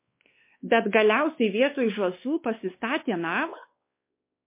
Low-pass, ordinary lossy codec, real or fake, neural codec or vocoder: 3.6 kHz; MP3, 24 kbps; fake; codec, 16 kHz, 2 kbps, X-Codec, WavLM features, trained on Multilingual LibriSpeech